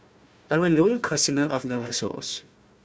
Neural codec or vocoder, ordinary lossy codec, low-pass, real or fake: codec, 16 kHz, 1 kbps, FunCodec, trained on Chinese and English, 50 frames a second; none; none; fake